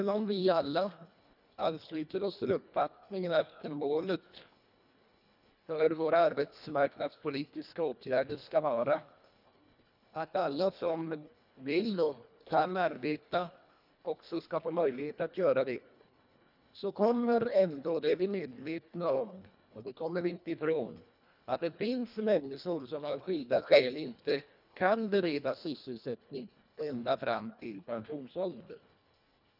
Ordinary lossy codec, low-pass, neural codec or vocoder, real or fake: none; 5.4 kHz; codec, 24 kHz, 1.5 kbps, HILCodec; fake